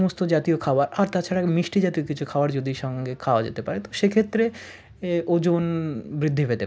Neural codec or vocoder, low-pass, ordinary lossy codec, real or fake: none; none; none; real